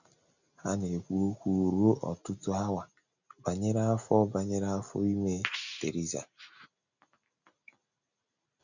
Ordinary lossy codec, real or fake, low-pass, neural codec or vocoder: none; real; 7.2 kHz; none